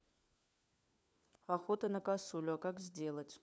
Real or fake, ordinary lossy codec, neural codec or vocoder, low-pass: fake; none; codec, 16 kHz, 4 kbps, FunCodec, trained on LibriTTS, 50 frames a second; none